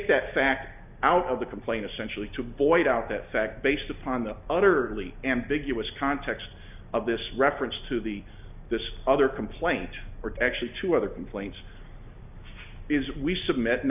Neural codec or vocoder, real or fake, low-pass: none; real; 3.6 kHz